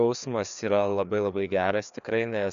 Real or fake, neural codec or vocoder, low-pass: fake; codec, 16 kHz, 2 kbps, FreqCodec, larger model; 7.2 kHz